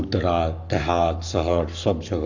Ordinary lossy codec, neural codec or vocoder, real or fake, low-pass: none; codec, 44.1 kHz, 7.8 kbps, Pupu-Codec; fake; 7.2 kHz